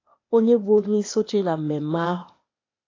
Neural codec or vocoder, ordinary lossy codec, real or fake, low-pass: codec, 16 kHz, 0.8 kbps, ZipCodec; AAC, 32 kbps; fake; 7.2 kHz